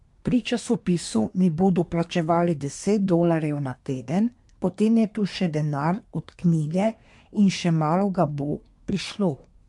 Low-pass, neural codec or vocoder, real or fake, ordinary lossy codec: 10.8 kHz; codec, 24 kHz, 1 kbps, SNAC; fake; MP3, 64 kbps